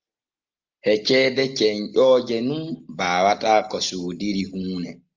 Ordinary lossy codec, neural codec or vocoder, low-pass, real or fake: Opus, 32 kbps; none; 7.2 kHz; real